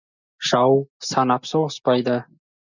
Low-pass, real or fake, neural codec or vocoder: 7.2 kHz; real; none